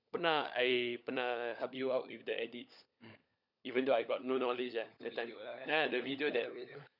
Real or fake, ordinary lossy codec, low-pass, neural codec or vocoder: fake; none; 5.4 kHz; codec, 16 kHz, 16 kbps, FunCodec, trained on LibriTTS, 50 frames a second